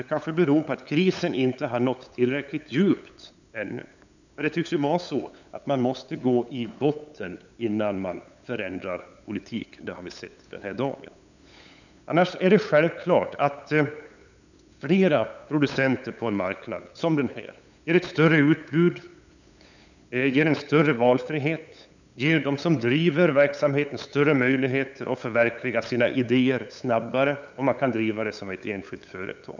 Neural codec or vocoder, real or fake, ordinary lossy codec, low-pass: codec, 16 kHz, 8 kbps, FunCodec, trained on LibriTTS, 25 frames a second; fake; none; 7.2 kHz